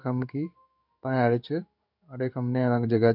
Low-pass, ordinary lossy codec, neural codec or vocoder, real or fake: 5.4 kHz; none; codec, 16 kHz in and 24 kHz out, 1 kbps, XY-Tokenizer; fake